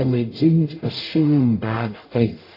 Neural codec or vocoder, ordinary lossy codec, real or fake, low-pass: codec, 44.1 kHz, 0.9 kbps, DAC; AAC, 24 kbps; fake; 5.4 kHz